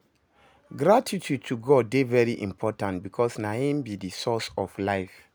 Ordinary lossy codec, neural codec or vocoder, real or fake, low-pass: none; none; real; none